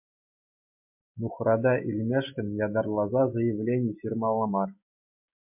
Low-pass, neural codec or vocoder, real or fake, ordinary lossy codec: 3.6 kHz; none; real; MP3, 32 kbps